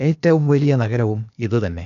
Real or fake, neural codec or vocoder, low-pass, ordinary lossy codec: fake; codec, 16 kHz, 0.8 kbps, ZipCodec; 7.2 kHz; none